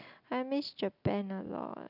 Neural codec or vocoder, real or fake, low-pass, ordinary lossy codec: none; real; 5.4 kHz; none